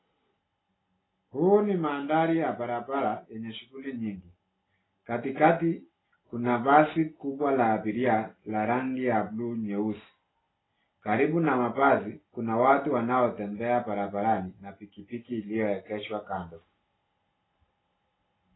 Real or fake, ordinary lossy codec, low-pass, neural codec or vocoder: real; AAC, 16 kbps; 7.2 kHz; none